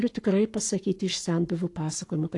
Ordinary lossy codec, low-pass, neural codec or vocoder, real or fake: AAC, 48 kbps; 10.8 kHz; codec, 44.1 kHz, 7.8 kbps, Pupu-Codec; fake